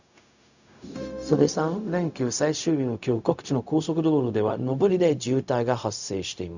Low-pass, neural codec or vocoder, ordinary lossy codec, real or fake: 7.2 kHz; codec, 16 kHz, 0.4 kbps, LongCat-Audio-Codec; none; fake